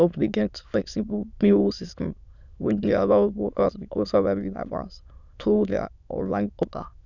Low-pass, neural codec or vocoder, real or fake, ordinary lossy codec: 7.2 kHz; autoencoder, 22.05 kHz, a latent of 192 numbers a frame, VITS, trained on many speakers; fake; none